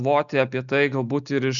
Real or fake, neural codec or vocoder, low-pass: real; none; 7.2 kHz